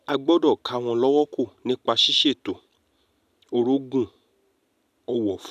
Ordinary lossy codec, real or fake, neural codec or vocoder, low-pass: none; real; none; 14.4 kHz